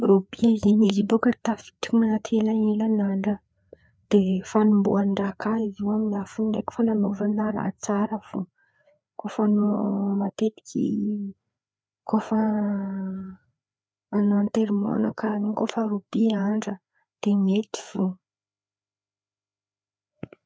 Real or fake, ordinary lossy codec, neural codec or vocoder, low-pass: fake; none; codec, 16 kHz, 4 kbps, FreqCodec, larger model; none